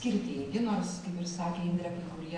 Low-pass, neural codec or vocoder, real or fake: 9.9 kHz; none; real